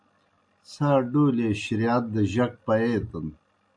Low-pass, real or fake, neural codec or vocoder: 9.9 kHz; real; none